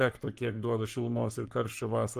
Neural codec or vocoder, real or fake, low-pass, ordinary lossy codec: codec, 44.1 kHz, 3.4 kbps, Pupu-Codec; fake; 14.4 kHz; Opus, 24 kbps